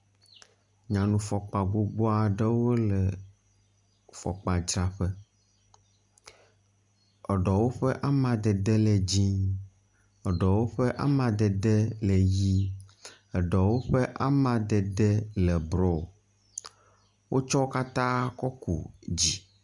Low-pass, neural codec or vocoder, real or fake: 10.8 kHz; none; real